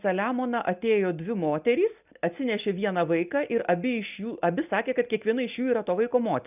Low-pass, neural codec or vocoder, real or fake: 3.6 kHz; none; real